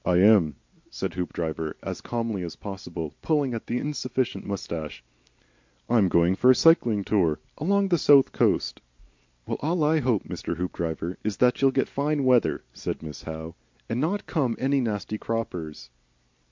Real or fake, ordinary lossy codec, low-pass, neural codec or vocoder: real; MP3, 48 kbps; 7.2 kHz; none